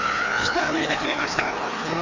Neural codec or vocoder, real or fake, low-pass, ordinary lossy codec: codec, 16 kHz, 2 kbps, FreqCodec, larger model; fake; 7.2 kHz; MP3, 48 kbps